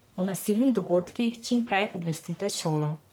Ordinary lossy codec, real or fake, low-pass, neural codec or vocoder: none; fake; none; codec, 44.1 kHz, 1.7 kbps, Pupu-Codec